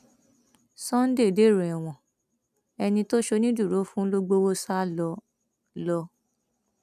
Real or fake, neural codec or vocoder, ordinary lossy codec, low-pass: real; none; none; 14.4 kHz